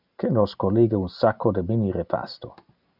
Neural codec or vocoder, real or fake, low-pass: none; real; 5.4 kHz